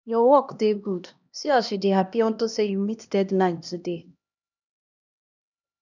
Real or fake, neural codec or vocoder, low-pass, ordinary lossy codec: fake; codec, 16 kHz, 1 kbps, X-Codec, HuBERT features, trained on LibriSpeech; 7.2 kHz; none